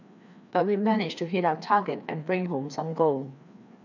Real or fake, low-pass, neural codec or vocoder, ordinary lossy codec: fake; 7.2 kHz; codec, 16 kHz, 2 kbps, FreqCodec, larger model; none